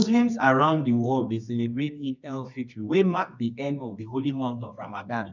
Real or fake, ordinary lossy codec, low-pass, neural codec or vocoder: fake; none; 7.2 kHz; codec, 24 kHz, 0.9 kbps, WavTokenizer, medium music audio release